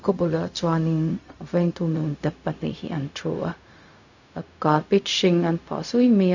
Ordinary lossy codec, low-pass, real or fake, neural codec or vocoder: none; 7.2 kHz; fake; codec, 16 kHz, 0.4 kbps, LongCat-Audio-Codec